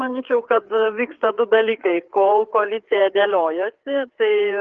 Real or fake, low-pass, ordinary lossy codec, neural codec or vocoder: fake; 7.2 kHz; Opus, 16 kbps; codec, 16 kHz, 4 kbps, FreqCodec, larger model